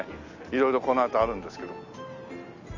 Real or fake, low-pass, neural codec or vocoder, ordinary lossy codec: real; 7.2 kHz; none; none